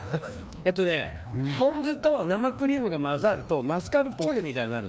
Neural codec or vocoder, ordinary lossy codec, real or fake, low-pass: codec, 16 kHz, 1 kbps, FreqCodec, larger model; none; fake; none